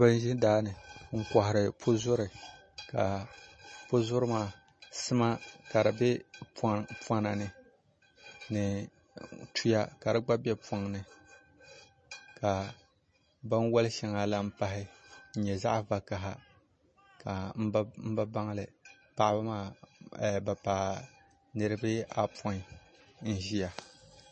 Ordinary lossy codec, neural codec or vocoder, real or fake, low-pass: MP3, 32 kbps; none; real; 10.8 kHz